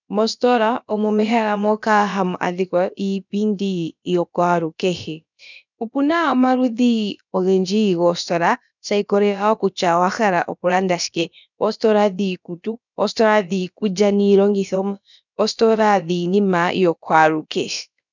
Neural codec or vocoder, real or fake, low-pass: codec, 16 kHz, about 1 kbps, DyCAST, with the encoder's durations; fake; 7.2 kHz